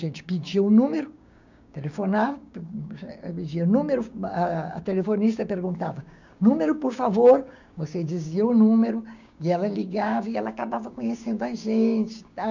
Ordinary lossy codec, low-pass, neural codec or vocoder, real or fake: none; 7.2 kHz; codec, 16 kHz, 6 kbps, DAC; fake